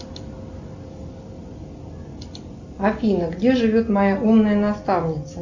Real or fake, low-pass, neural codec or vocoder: real; 7.2 kHz; none